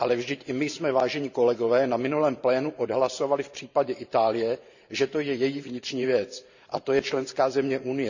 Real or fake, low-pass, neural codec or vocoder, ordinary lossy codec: fake; 7.2 kHz; vocoder, 44.1 kHz, 128 mel bands every 512 samples, BigVGAN v2; none